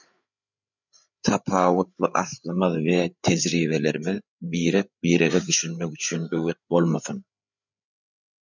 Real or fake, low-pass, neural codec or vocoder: fake; 7.2 kHz; codec, 16 kHz, 8 kbps, FreqCodec, larger model